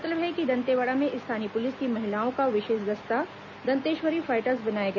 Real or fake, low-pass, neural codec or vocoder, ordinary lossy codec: real; 7.2 kHz; none; none